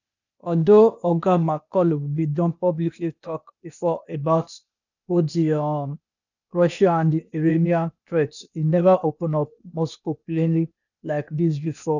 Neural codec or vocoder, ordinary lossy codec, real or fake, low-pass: codec, 16 kHz, 0.8 kbps, ZipCodec; none; fake; 7.2 kHz